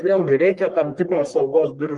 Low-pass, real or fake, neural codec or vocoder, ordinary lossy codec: 10.8 kHz; fake; codec, 44.1 kHz, 1.7 kbps, Pupu-Codec; Opus, 24 kbps